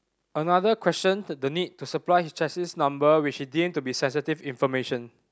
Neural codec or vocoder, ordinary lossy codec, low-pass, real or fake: none; none; none; real